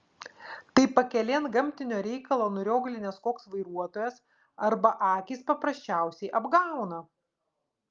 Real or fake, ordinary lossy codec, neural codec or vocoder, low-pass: real; Opus, 32 kbps; none; 7.2 kHz